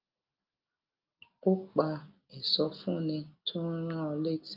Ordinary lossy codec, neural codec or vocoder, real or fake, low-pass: Opus, 24 kbps; none; real; 5.4 kHz